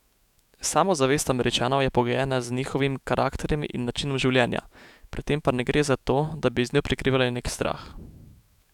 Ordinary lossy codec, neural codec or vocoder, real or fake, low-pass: none; autoencoder, 48 kHz, 128 numbers a frame, DAC-VAE, trained on Japanese speech; fake; 19.8 kHz